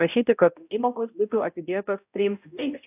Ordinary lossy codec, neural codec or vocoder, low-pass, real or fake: AAC, 32 kbps; codec, 16 kHz, 0.5 kbps, X-Codec, HuBERT features, trained on balanced general audio; 3.6 kHz; fake